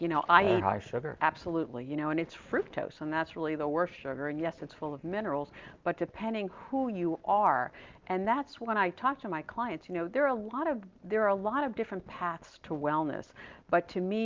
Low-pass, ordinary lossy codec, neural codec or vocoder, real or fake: 7.2 kHz; Opus, 24 kbps; none; real